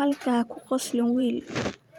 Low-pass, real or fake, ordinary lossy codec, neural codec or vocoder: 19.8 kHz; fake; none; vocoder, 44.1 kHz, 128 mel bands every 512 samples, BigVGAN v2